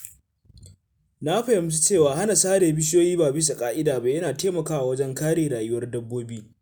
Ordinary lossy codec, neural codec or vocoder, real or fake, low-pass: none; none; real; none